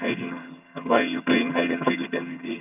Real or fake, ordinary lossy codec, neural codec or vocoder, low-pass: fake; none; vocoder, 22.05 kHz, 80 mel bands, HiFi-GAN; 3.6 kHz